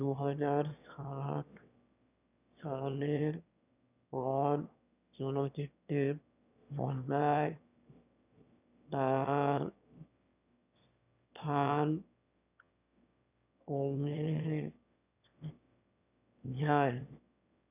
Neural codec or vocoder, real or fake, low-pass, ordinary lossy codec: autoencoder, 22.05 kHz, a latent of 192 numbers a frame, VITS, trained on one speaker; fake; 3.6 kHz; none